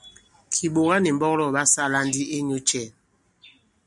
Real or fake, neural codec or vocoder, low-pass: real; none; 10.8 kHz